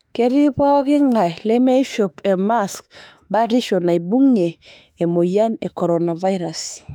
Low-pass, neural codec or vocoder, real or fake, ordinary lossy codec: 19.8 kHz; autoencoder, 48 kHz, 32 numbers a frame, DAC-VAE, trained on Japanese speech; fake; none